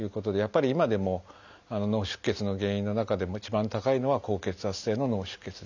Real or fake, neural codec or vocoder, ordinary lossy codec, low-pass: real; none; none; 7.2 kHz